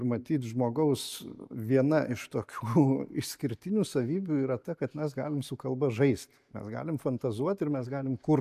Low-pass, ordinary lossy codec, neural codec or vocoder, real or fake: 14.4 kHz; MP3, 96 kbps; none; real